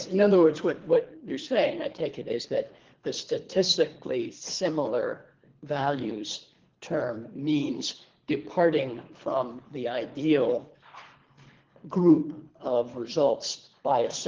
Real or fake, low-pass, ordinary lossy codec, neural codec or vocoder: fake; 7.2 kHz; Opus, 16 kbps; codec, 24 kHz, 3 kbps, HILCodec